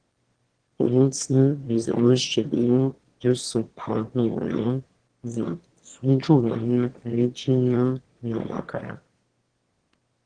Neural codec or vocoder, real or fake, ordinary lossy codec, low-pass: autoencoder, 22.05 kHz, a latent of 192 numbers a frame, VITS, trained on one speaker; fake; Opus, 16 kbps; 9.9 kHz